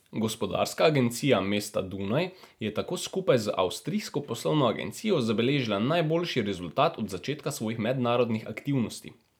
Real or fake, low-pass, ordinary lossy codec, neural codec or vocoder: real; none; none; none